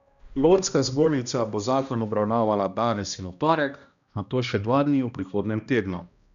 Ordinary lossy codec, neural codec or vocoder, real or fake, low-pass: none; codec, 16 kHz, 1 kbps, X-Codec, HuBERT features, trained on balanced general audio; fake; 7.2 kHz